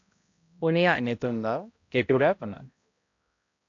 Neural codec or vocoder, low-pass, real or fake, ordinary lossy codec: codec, 16 kHz, 0.5 kbps, X-Codec, HuBERT features, trained on balanced general audio; 7.2 kHz; fake; AAC, 48 kbps